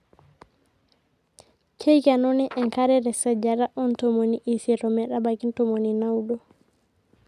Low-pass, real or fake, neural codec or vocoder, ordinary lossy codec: 14.4 kHz; real; none; none